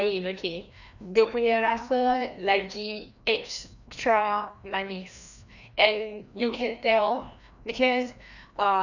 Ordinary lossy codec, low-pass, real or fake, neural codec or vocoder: none; 7.2 kHz; fake; codec, 16 kHz, 1 kbps, FreqCodec, larger model